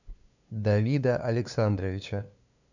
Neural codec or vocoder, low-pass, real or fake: codec, 16 kHz, 2 kbps, FunCodec, trained on LibriTTS, 25 frames a second; 7.2 kHz; fake